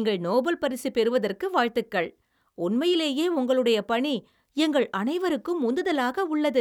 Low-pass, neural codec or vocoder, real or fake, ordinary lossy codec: 19.8 kHz; none; real; none